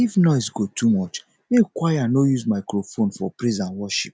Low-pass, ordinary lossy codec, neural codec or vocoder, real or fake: none; none; none; real